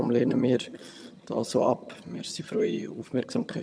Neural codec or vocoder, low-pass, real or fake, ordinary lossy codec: vocoder, 22.05 kHz, 80 mel bands, HiFi-GAN; none; fake; none